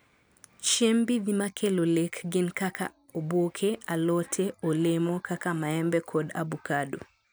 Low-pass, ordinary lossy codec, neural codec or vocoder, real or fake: none; none; none; real